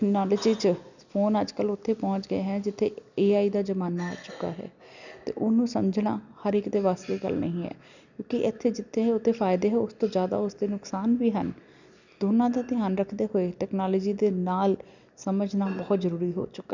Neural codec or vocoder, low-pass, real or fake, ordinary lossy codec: none; 7.2 kHz; real; none